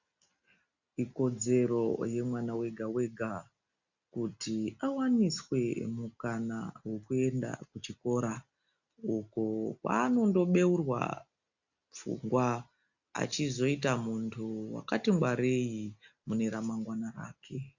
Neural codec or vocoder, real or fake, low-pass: none; real; 7.2 kHz